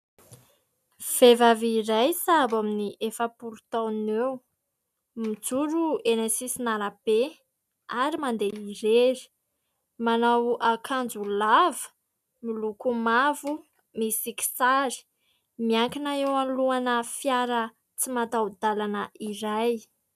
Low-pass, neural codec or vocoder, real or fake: 14.4 kHz; none; real